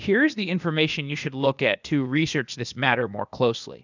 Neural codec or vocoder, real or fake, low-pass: codec, 16 kHz, 0.8 kbps, ZipCodec; fake; 7.2 kHz